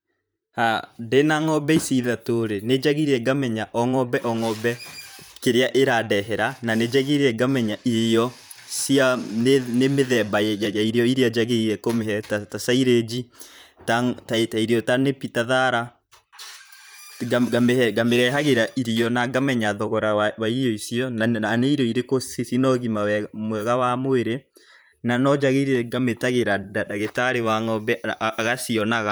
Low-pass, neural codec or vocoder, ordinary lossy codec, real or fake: none; vocoder, 44.1 kHz, 128 mel bands, Pupu-Vocoder; none; fake